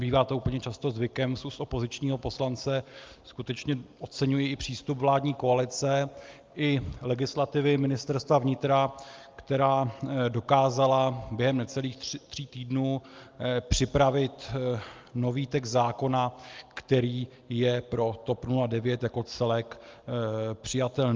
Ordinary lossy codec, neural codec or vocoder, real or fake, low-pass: Opus, 24 kbps; none; real; 7.2 kHz